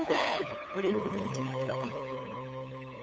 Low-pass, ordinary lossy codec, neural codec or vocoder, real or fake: none; none; codec, 16 kHz, 8 kbps, FunCodec, trained on LibriTTS, 25 frames a second; fake